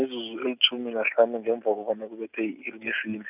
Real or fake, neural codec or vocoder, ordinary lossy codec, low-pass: real; none; none; 3.6 kHz